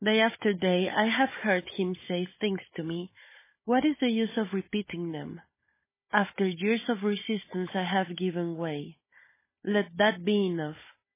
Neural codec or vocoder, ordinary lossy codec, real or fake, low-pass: codec, 16 kHz, 16 kbps, FunCodec, trained on Chinese and English, 50 frames a second; MP3, 16 kbps; fake; 3.6 kHz